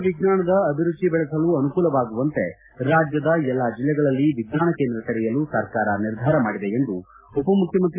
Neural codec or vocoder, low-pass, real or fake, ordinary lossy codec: none; 3.6 kHz; real; AAC, 24 kbps